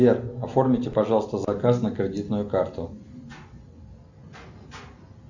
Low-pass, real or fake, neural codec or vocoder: 7.2 kHz; real; none